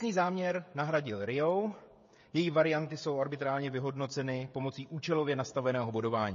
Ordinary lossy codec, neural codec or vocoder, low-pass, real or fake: MP3, 32 kbps; codec, 16 kHz, 16 kbps, FreqCodec, smaller model; 7.2 kHz; fake